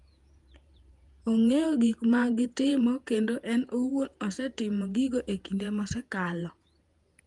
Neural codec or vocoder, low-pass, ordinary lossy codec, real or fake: vocoder, 48 kHz, 128 mel bands, Vocos; 10.8 kHz; Opus, 32 kbps; fake